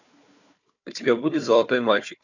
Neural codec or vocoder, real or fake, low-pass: codec, 16 kHz, 4 kbps, FunCodec, trained on Chinese and English, 50 frames a second; fake; 7.2 kHz